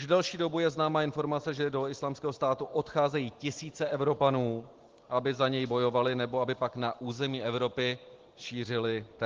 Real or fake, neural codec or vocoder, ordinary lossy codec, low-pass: real; none; Opus, 16 kbps; 7.2 kHz